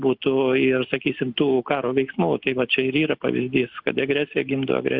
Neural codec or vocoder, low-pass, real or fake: none; 5.4 kHz; real